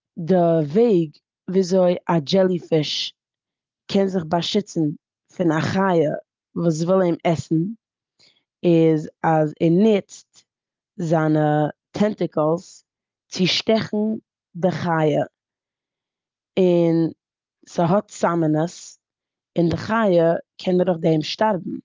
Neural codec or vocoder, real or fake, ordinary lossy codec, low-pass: none; real; Opus, 32 kbps; 7.2 kHz